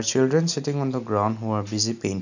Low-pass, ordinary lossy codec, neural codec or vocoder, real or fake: 7.2 kHz; none; none; real